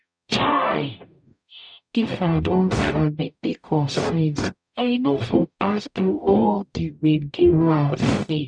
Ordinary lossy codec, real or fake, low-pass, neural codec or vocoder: none; fake; 9.9 kHz; codec, 44.1 kHz, 0.9 kbps, DAC